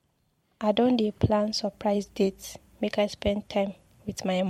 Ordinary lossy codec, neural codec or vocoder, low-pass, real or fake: MP3, 64 kbps; vocoder, 44.1 kHz, 128 mel bands every 512 samples, BigVGAN v2; 19.8 kHz; fake